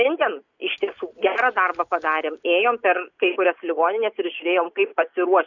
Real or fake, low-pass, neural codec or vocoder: real; 7.2 kHz; none